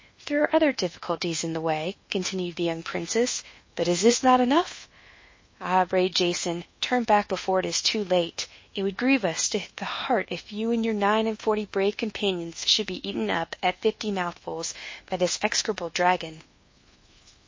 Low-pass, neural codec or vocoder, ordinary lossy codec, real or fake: 7.2 kHz; codec, 24 kHz, 1.2 kbps, DualCodec; MP3, 32 kbps; fake